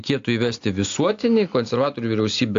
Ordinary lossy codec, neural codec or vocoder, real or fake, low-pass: AAC, 48 kbps; none; real; 7.2 kHz